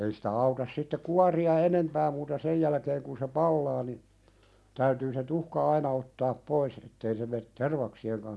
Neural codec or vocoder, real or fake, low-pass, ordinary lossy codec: none; real; none; none